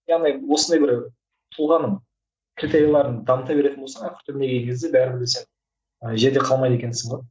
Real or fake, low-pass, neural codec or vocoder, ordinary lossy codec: real; none; none; none